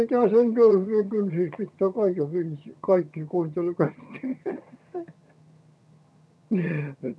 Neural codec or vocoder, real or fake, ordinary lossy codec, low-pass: vocoder, 22.05 kHz, 80 mel bands, HiFi-GAN; fake; none; none